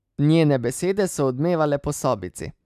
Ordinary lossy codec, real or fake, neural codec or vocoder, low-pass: none; real; none; 14.4 kHz